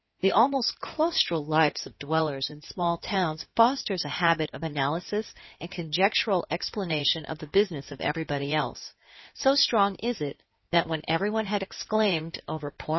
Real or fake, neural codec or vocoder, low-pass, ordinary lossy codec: fake; codec, 16 kHz in and 24 kHz out, 2.2 kbps, FireRedTTS-2 codec; 7.2 kHz; MP3, 24 kbps